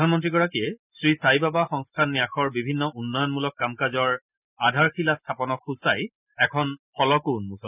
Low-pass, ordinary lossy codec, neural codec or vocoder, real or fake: 3.6 kHz; none; none; real